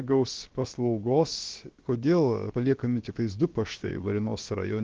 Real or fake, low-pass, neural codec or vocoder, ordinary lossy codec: fake; 7.2 kHz; codec, 16 kHz, about 1 kbps, DyCAST, with the encoder's durations; Opus, 16 kbps